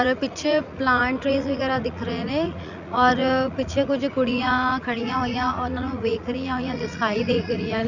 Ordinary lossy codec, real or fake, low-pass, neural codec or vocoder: none; fake; 7.2 kHz; vocoder, 44.1 kHz, 80 mel bands, Vocos